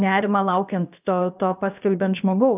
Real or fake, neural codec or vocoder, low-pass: fake; codec, 16 kHz, about 1 kbps, DyCAST, with the encoder's durations; 3.6 kHz